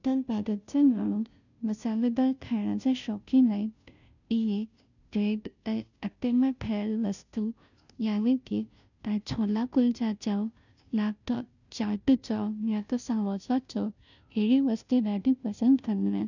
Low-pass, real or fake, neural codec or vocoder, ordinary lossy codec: 7.2 kHz; fake; codec, 16 kHz, 0.5 kbps, FunCodec, trained on Chinese and English, 25 frames a second; none